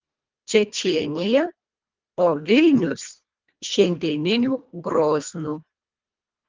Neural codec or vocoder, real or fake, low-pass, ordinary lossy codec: codec, 24 kHz, 1.5 kbps, HILCodec; fake; 7.2 kHz; Opus, 32 kbps